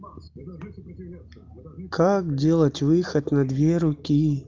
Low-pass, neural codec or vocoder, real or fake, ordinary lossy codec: 7.2 kHz; none; real; Opus, 32 kbps